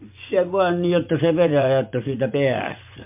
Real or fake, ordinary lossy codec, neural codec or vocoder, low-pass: fake; MP3, 32 kbps; vocoder, 24 kHz, 100 mel bands, Vocos; 3.6 kHz